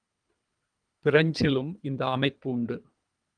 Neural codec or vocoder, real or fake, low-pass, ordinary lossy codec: codec, 24 kHz, 3 kbps, HILCodec; fake; 9.9 kHz; Opus, 32 kbps